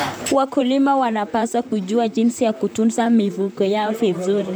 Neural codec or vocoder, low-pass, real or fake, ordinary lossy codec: vocoder, 44.1 kHz, 128 mel bands, Pupu-Vocoder; none; fake; none